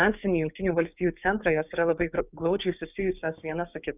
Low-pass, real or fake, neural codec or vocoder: 3.6 kHz; fake; codec, 16 kHz, 6 kbps, DAC